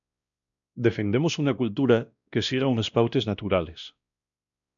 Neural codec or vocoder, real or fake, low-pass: codec, 16 kHz, 1 kbps, X-Codec, WavLM features, trained on Multilingual LibriSpeech; fake; 7.2 kHz